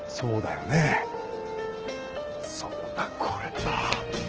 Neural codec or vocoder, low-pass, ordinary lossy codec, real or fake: none; 7.2 kHz; Opus, 16 kbps; real